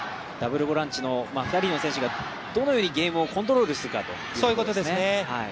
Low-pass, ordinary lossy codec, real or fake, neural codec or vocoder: none; none; real; none